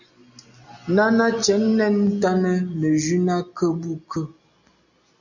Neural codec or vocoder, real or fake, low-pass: none; real; 7.2 kHz